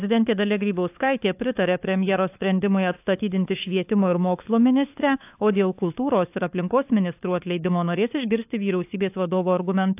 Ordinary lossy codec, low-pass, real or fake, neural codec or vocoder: AAC, 32 kbps; 3.6 kHz; fake; codec, 16 kHz, 4 kbps, FunCodec, trained on LibriTTS, 50 frames a second